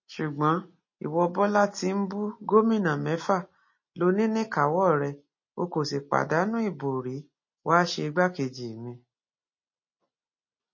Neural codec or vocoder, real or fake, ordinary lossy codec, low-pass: none; real; MP3, 32 kbps; 7.2 kHz